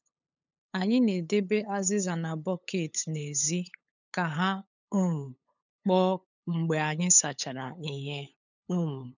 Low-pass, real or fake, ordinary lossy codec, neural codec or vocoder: 7.2 kHz; fake; none; codec, 16 kHz, 8 kbps, FunCodec, trained on LibriTTS, 25 frames a second